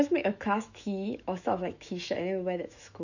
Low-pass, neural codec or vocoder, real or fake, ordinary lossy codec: 7.2 kHz; autoencoder, 48 kHz, 128 numbers a frame, DAC-VAE, trained on Japanese speech; fake; none